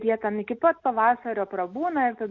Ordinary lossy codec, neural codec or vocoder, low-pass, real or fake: Opus, 64 kbps; none; 7.2 kHz; real